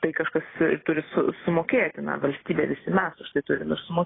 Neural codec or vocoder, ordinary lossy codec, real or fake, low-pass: vocoder, 44.1 kHz, 128 mel bands every 256 samples, BigVGAN v2; AAC, 16 kbps; fake; 7.2 kHz